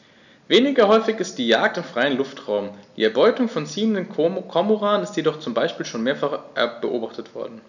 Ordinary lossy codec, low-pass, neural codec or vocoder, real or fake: none; 7.2 kHz; none; real